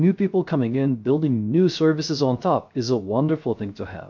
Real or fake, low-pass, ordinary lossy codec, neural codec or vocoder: fake; 7.2 kHz; MP3, 64 kbps; codec, 16 kHz, 0.3 kbps, FocalCodec